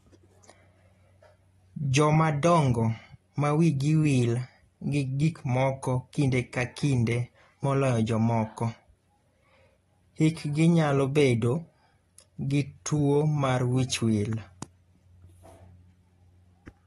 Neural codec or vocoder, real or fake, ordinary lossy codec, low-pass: none; real; AAC, 32 kbps; 19.8 kHz